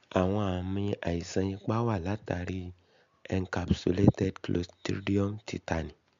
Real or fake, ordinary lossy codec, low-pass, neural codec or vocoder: real; MP3, 64 kbps; 7.2 kHz; none